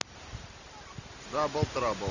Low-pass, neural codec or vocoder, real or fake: 7.2 kHz; none; real